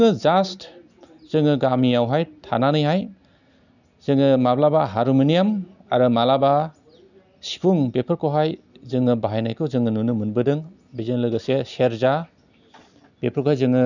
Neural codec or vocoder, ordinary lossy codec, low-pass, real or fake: none; none; 7.2 kHz; real